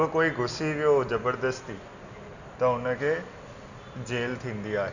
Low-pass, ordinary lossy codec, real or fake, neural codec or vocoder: 7.2 kHz; none; real; none